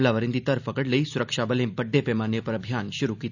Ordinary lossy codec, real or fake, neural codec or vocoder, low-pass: none; real; none; 7.2 kHz